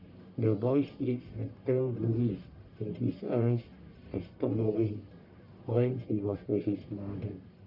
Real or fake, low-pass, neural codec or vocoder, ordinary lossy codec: fake; 5.4 kHz; codec, 44.1 kHz, 1.7 kbps, Pupu-Codec; none